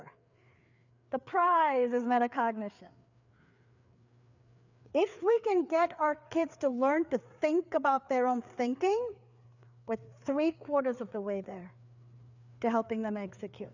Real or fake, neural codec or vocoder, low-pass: fake; codec, 16 kHz, 4 kbps, FreqCodec, larger model; 7.2 kHz